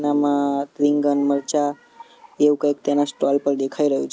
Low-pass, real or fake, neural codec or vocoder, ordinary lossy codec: none; real; none; none